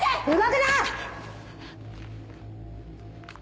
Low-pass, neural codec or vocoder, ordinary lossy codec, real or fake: none; none; none; real